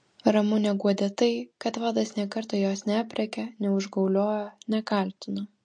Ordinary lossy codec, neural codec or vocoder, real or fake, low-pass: MP3, 48 kbps; none; real; 9.9 kHz